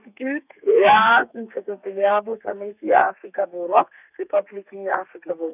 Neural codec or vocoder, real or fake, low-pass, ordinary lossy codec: codec, 32 kHz, 1.9 kbps, SNAC; fake; 3.6 kHz; none